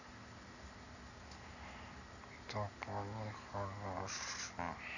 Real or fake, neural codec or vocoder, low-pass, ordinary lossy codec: real; none; 7.2 kHz; none